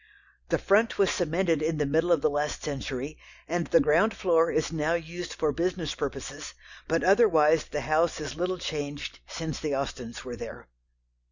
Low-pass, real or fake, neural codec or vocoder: 7.2 kHz; real; none